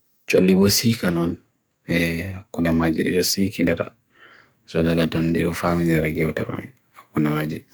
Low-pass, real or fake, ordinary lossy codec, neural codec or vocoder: none; fake; none; codec, 44.1 kHz, 2.6 kbps, SNAC